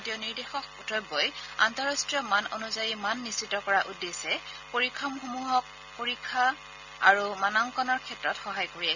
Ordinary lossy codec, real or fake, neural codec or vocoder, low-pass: none; real; none; 7.2 kHz